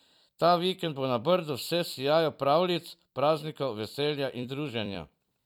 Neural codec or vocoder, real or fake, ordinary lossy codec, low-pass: vocoder, 44.1 kHz, 128 mel bands every 256 samples, BigVGAN v2; fake; none; 19.8 kHz